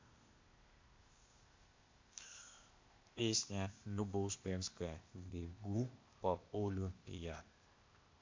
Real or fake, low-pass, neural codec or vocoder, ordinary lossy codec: fake; 7.2 kHz; codec, 16 kHz, 0.8 kbps, ZipCodec; MP3, 48 kbps